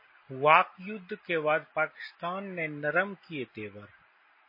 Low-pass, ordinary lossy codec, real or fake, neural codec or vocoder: 5.4 kHz; MP3, 24 kbps; real; none